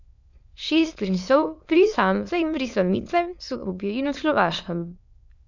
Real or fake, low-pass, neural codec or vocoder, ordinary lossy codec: fake; 7.2 kHz; autoencoder, 22.05 kHz, a latent of 192 numbers a frame, VITS, trained on many speakers; none